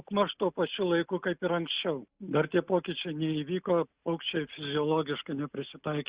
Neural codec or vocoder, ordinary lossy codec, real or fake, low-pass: none; Opus, 64 kbps; real; 3.6 kHz